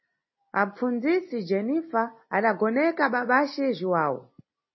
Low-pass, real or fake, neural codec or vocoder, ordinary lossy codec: 7.2 kHz; real; none; MP3, 24 kbps